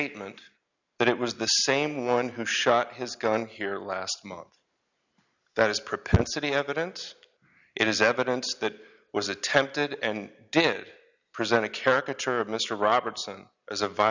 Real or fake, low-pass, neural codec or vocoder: real; 7.2 kHz; none